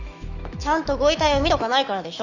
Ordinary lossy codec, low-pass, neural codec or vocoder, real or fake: none; 7.2 kHz; autoencoder, 48 kHz, 128 numbers a frame, DAC-VAE, trained on Japanese speech; fake